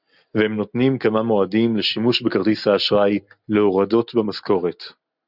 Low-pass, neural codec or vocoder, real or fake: 5.4 kHz; none; real